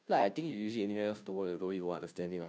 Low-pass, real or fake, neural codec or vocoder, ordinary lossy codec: none; fake; codec, 16 kHz, 0.5 kbps, FunCodec, trained on Chinese and English, 25 frames a second; none